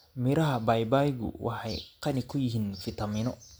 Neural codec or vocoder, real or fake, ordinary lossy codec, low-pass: none; real; none; none